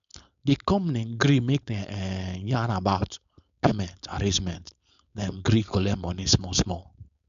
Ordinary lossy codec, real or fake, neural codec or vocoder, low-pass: none; fake; codec, 16 kHz, 4.8 kbps, FACodec; 7.2 kHz